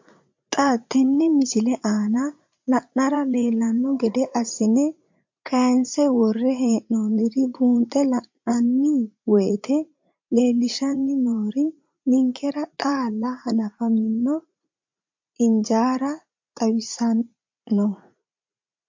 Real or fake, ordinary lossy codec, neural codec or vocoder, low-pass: fake; MP3, 48 kbps; vocoder, 44.1 kHz, 80 mel bands, Vocos; 7.2 kHz